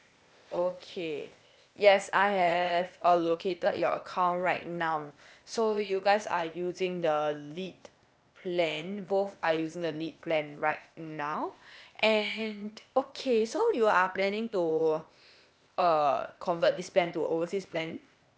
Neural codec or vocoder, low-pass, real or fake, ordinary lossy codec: codec, 16 kHz, 0.8 kbps, ZipCodec; none; fake; none